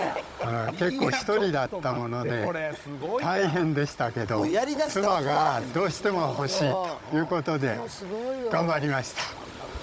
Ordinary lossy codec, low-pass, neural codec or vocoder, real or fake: none; none; codec, 16 kHz, 16 kbps, FunCodec, trained on Chinese and English, 50 frames a second; fake